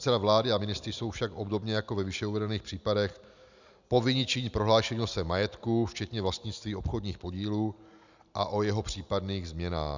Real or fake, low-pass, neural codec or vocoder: real; 7.2 kHz; none